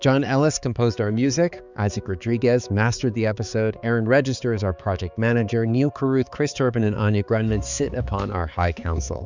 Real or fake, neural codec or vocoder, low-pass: fake; codec, 16 kHz, 4 kbps, X-Codec, HuBERT features, trained on balanced general audio; 7.2 kHz